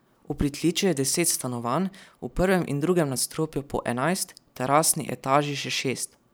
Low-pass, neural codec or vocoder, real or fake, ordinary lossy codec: none; vocoder, 44.1 kHz, 128 mel bands every 512 samples, BigVGAN v2; fake; none